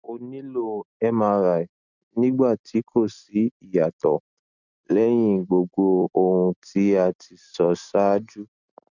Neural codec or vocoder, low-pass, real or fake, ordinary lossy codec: none; 7.2 kHz; real; none